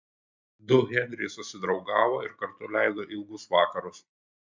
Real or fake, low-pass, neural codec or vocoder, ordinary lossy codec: real; 7.2 kHz; none; MP3, 64 kbps